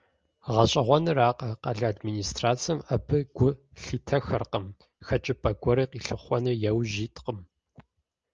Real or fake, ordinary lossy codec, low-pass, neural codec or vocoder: real; Opus, 24 kbps; 7.2 kHz; none